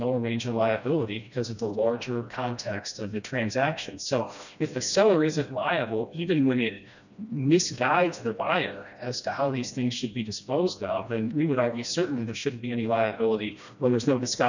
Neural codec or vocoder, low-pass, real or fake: codec, 16 kHz, 1 kbps, FreqCodec, smaller model; 7.2 kHz; fake